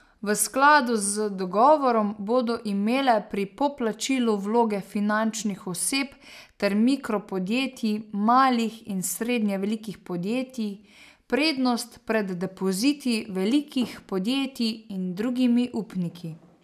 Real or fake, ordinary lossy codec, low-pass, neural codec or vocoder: real; none; 14.4 kHz; none